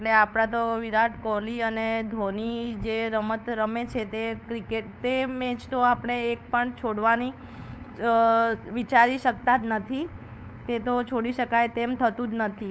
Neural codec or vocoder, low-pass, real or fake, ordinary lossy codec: codec, 16 kHz, 8 kbps, FunCodec, trained on LibriTTS, 25 frames a second; none; fake; none